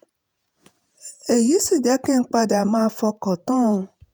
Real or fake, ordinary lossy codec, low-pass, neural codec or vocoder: fake; none; none; vocoder, 48 kHz, 128 mel bands, Vocos